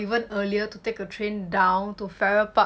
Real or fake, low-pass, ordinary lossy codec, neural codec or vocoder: real; none; none; none